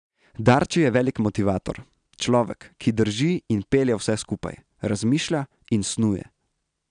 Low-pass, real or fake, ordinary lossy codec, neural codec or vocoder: 9.9 kHz; real; none; none